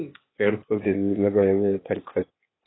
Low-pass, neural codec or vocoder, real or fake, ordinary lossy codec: 7.2 kHz; codec, 16 kHz, 0.8 kbps, ZipCodec; fake; AAC, 16 kbps